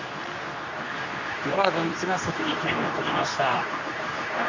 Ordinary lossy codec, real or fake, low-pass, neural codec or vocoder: MP3, 48 kbps; fake; 7.2 kHz; codec, 24 kHz, 0.9 kbps, WavTokenizer, medium speech release version 2